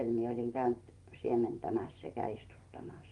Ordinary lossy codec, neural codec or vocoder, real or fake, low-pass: Opus, 24 kbps; vocoder, 24 kHz, 100 mel bands, Vocos; fake; 10.8 kHz